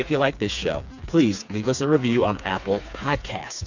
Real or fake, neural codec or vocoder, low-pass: fake; codec, 16 kHz, 4 kbps, FreqCodec, smaller model; 7.2 kHz